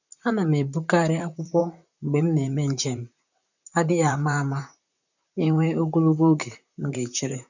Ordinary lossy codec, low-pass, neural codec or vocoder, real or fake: none; 7.2 kHz; vocoder, 44.1 kHz, 128 mel bands, Pupu-Vocoder; fake